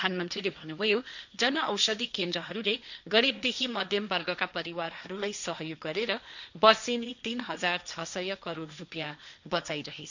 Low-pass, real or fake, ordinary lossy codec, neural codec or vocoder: 7.2 kHz; fake; none; codec, 16 kHz, 1.1 kbps, Voila-Tokenizer